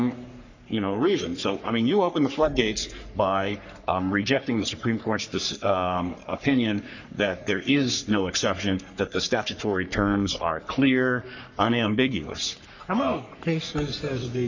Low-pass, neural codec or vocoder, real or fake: 7.2 kHz; codec, 44.1 kHz, 3.4 kbps, Pupu-Codec; fake